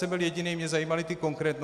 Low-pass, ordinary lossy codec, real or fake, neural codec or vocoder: 14.4 kHz; AAC, 96 kbps; real; none